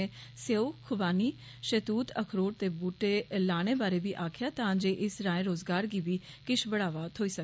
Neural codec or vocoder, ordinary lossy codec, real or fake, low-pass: none; none; real; none